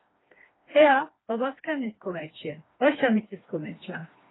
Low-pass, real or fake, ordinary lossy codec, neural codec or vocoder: 7.2 kHz; fake; AAC, 16 kbps; codec, 16 kHz, 2 kbps, FreqCodec, smaller model